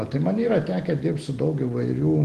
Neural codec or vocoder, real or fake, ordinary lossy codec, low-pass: none; real; Opus, 16 kbps; 10.8 kHz